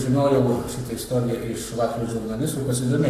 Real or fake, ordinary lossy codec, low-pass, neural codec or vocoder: fake; AAC, 64 kbps; 14.4 kHz; codec, 44.1 kHz, 7.8 kbps, Pupu-Codec